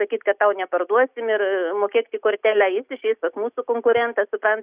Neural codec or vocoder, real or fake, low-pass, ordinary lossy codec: none; real; 3.6 kHz; Opus, 64 kbps